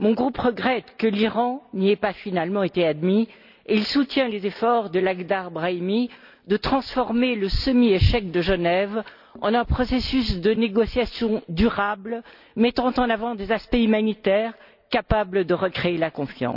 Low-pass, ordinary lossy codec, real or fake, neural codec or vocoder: 5.4 kHz; none; real; none